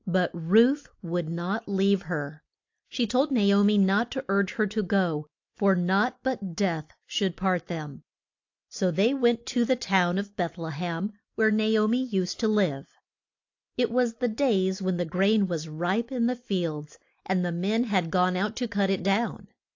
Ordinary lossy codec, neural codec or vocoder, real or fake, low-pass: AAC, 48 kbps; none; real; 7.2 kHz